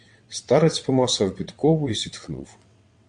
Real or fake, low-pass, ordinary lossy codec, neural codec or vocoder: fake; 9.9 kHz; MP3, 64 kbps; vocoder, 22.05 kHz, 80 mel bands, WaveNeXt